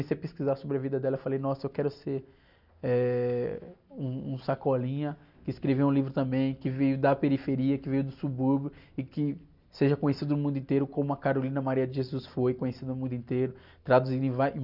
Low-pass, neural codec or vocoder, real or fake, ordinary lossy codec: 5.4 kHz; none; real; none